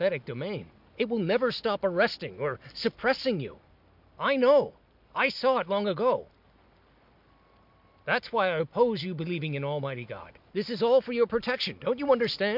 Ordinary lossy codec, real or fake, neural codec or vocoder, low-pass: MP3, 48 kbps; real; none; 5.4 kHz